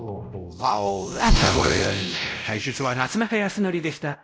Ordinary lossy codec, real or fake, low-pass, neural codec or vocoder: none; fake; none; codec, 16 kHz, 0.5 kbps, X-Codec, WavLM features, trained on Multilingual LibriSpeech